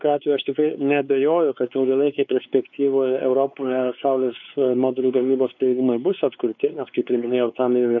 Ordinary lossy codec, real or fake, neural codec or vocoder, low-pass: MP3, 48 kbps; fake; codec, 16 kHz, 4 kbps, X-Codec, WavLM features, trained on Multilingual LibriSpeech; 7.2 kHz